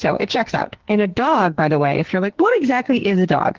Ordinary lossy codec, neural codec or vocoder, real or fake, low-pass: Opus, 16 kbps; codec, 44.1 kHz, 2.6 kbps, SNAC; fake; 7.2 kHz